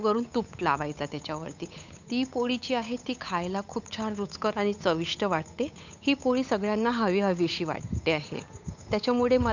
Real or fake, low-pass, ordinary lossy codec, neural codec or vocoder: fake; 7.2 kHz; none; codec, 16 kHz, 8 kbps, FunCodec, trained on Chinese and English, 25 frames a second